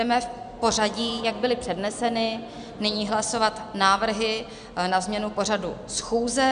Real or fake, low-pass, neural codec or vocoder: real; 9.9 kHz; none